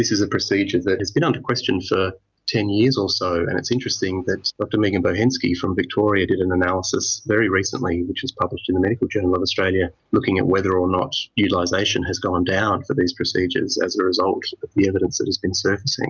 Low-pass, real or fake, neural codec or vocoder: 7.2 kHz; real; none